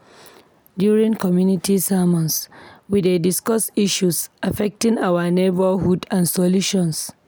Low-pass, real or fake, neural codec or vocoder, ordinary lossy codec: none; real; none; none